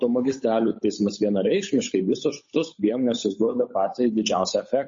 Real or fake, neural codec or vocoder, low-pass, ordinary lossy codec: fake; codec, 16 kHz, 8 kbps, FunCodec, trained on Chinese and English, 25 frames a second; 7.2 kHz; MP3, 32 kbps